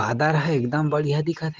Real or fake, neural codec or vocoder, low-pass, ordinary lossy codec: real; none; 7.2 kHz; Opus, 16 kbps